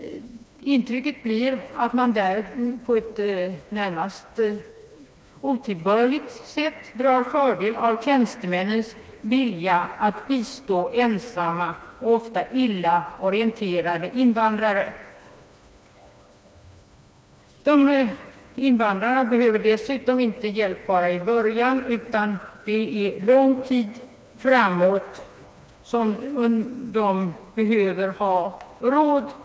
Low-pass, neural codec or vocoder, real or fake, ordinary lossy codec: none; codec, 16 kHz, 2 kbps, FreqCodec, smaller model; fake; none